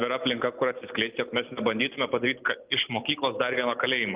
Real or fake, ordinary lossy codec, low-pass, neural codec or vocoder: real; Opus, 24 kbps; 3.6 kHz; none